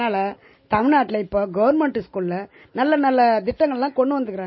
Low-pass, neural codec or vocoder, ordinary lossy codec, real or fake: 7.2 kHz; none; MP3, 24 kbps; real